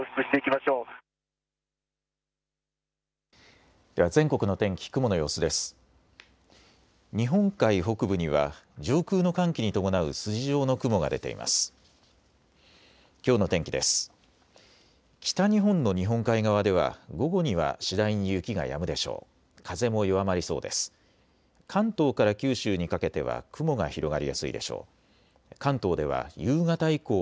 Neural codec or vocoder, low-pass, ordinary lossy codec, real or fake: none; none; none; real